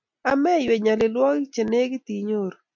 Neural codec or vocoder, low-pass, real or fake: none; 7.2 kHz; real